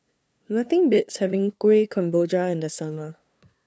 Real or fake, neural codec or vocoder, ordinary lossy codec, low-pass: fake; codec, 16 kHz, 2 kbps, FunCodec, trained on LibriTTS, 25 frames a second; none; none